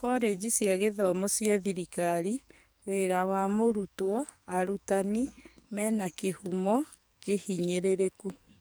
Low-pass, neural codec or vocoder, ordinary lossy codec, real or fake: none; codec, 44.1 kHz, 2.6 kbps, SNAC; none; fake